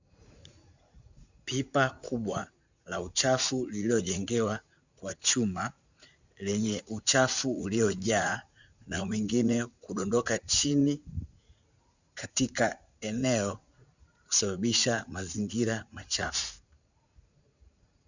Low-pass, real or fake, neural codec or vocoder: 7.2 kHz; fake; vocoder, 44.1 kHz, 80 mel bands, Vocos